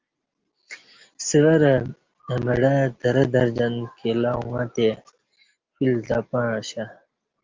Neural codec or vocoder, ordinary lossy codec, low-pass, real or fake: none; Opus, 32 kbps; 7.2 kHz; real